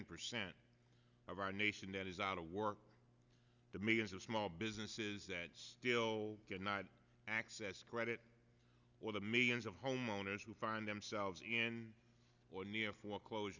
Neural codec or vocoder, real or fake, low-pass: none; real; 7.2 kHz